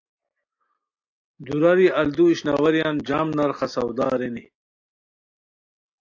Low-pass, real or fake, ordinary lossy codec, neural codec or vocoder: 7.2 kHz; real; AAC, 48 kbps; none